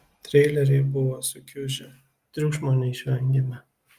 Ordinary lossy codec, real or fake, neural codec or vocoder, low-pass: Opus, 32 kbps; real; none; 14.4 kHz